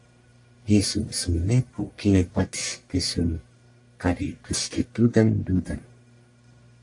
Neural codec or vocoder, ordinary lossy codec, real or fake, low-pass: codec, 44.1 kHz, 1.7 kbps, Pupu-Codec; AAC, 64 kbps; fake; 10.8 kHz